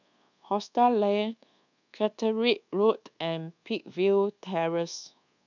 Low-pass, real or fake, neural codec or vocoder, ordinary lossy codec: 7.2 kHz; fake; codec, 24 kHz, 1.2 kbps, DualCodec; none